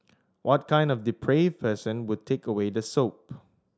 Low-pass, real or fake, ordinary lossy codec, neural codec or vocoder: none; real; none; none